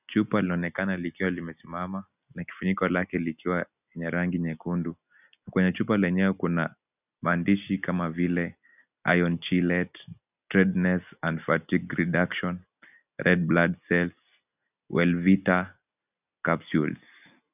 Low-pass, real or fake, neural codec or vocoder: 3.6 kHz; real; none